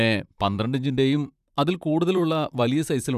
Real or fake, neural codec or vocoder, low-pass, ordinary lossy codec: fake; vocoder, 44.1 kHz, 128 mel bands, Pupu-Vocoder; 14.4 kHz; none